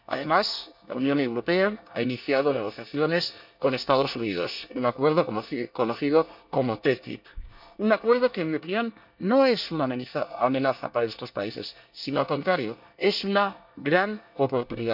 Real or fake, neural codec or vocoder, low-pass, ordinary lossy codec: fake; codec, 24 kHz, 1 kbps, SNAC; 5.4 kHz; none